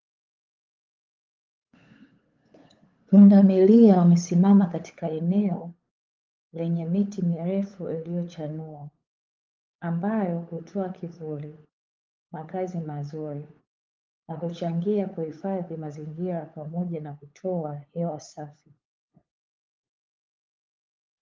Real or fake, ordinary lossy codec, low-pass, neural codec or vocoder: fake; Opus, 32 kbps; 7.2 kHz; codec, 16 kHz, 8 kbps, FunCodec, trained on LibriTTS, 25 frames a second